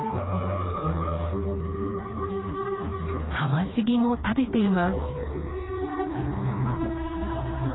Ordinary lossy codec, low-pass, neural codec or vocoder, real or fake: AAC, 16 kbps; 7.2 kHz; codec, 16 kHz, 2 kbps, FreqCodec, smaller model; fake